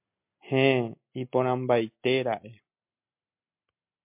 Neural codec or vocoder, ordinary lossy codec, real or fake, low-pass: none; MP3, 32 kbps; real; 3.6 kHz